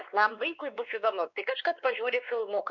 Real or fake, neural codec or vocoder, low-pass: fake; codec, 16 kHz, 2 kbps, X-Codec, WavLM features, trained on Multilingual LibriSpeech; 7.2 kHz